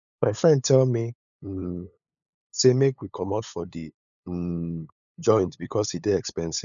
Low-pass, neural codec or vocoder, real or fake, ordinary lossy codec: 7.2 kHz; codec, 16 kHz, 8 kbps, FunCodec, trained on LibriTTS, 25 frames a second; fake; none